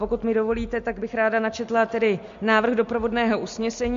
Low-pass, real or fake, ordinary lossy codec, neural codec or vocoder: 7.2 kHz; real; MP3, 48 kbps; none